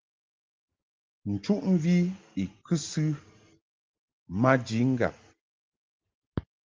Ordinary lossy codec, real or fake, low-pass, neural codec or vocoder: Opus, 32 kbps; real; 7.2 kHz; none